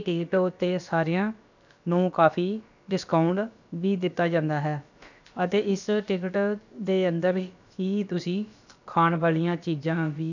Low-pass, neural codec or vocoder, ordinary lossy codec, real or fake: 7.2 kHz; codec, 16 kHz, about 1 kbps, DyCAST, with the encoder's durations; none; fake